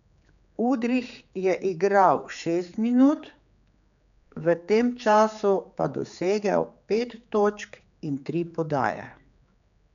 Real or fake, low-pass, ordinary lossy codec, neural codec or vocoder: fake; 7.2 kHz; none; codec, 16 kHz, 4 kbps, X-Codec, HuBERT features, trained on general audio